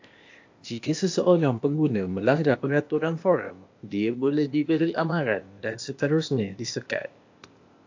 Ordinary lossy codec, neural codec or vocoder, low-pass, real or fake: AAC, 48 kbps; codec, 16 kHz, 0.8 kbps, ZipCodec; 7.2 kHz; fake